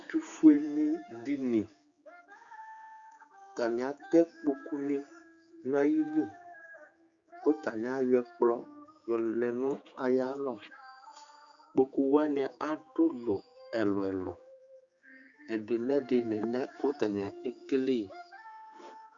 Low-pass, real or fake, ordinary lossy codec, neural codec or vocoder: 7.2 kHz; fake; Opus, 64 kbps; codec, 16 kHz, 4 kbps, X-Codec, HuBERT features, trained on general audio